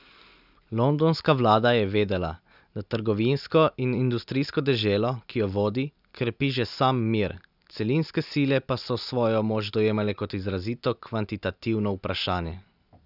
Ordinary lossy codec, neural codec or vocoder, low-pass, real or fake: none; none; 5.4 kHz; real